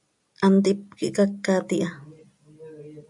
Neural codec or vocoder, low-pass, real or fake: none; 10.8 kHz; real